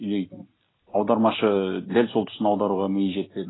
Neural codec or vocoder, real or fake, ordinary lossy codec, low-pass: none; real; AAC, 16 kbps; 7.2 kHz